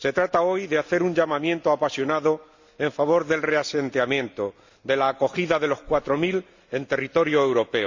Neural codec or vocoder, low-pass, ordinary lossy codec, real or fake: none; 7.2 kHz; Opus, 64 kbps; real